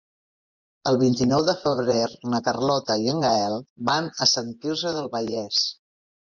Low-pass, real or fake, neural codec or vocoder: 7.2 kHz; fake; vocoder, 24 kHz, 100 mel bands, Vocos